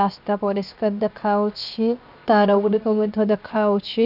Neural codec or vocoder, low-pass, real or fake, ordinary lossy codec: codec, 16 kHz, 0.7 kbps, FocalCodec; 5.4 kHz; fake; none